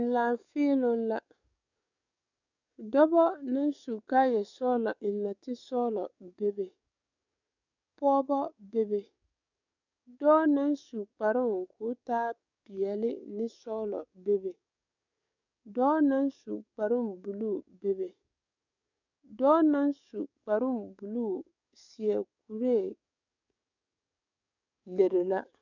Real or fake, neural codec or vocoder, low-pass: fake; codec, 44.1 kHz, 7.8 kbps, DAC; 7.2 kHz